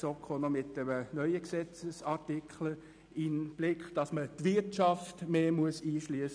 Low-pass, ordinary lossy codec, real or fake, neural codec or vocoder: 9.9 kHz; none; real; none